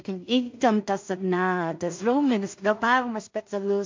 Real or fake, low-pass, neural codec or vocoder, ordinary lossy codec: fake; 7.2 kHz; codec, 16 kHz in and 24 kHz out, 0.4 kbps, LongCat-Audio-Codec, two codebook decoder; MP3, 48 kbps